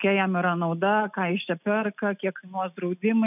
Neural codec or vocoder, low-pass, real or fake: none; 3.6 kHz; real